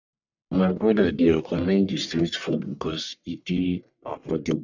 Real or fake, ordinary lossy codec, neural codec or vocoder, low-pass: fake; none; codec, 44.1 kHz, 1.7 kbps, Pupu-Codec; 7.2 kHz